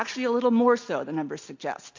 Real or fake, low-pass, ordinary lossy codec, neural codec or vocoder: real; 7.2 kHz; MP3, 48 kbps; none